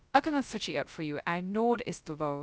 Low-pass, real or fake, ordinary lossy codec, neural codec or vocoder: none; fake; none; codec, 16 kHz, 0.2 kbps, FocalCodec